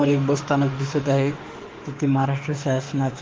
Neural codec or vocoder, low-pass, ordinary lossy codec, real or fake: autoencoder, 48 kHz, 32 numbers a frame, DAC-VAE, trained on Japanese speech; 7.2 kHz; Opus, 16 kbps; fake